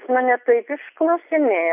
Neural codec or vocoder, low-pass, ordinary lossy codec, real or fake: none; 3.6 kHz; AAC, 32 kbps; real